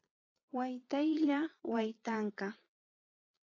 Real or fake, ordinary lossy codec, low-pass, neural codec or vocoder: fake; AAC, 32 kbps; 7.2 kHz; vocoder, 22.05 kHz, 80 mel bands, Vocos